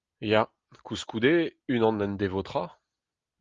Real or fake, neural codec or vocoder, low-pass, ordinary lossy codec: real; none; 7.2 kHz; Opus, 32 kbps